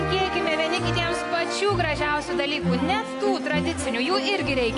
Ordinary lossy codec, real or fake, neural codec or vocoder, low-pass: AAC, 48 kbps; real; none; 10.8 kHz